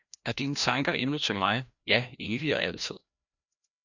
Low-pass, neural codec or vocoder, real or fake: 7.2 kHz; codec, 16 kHz, 1 kbps, FreqCodec, larger model; fake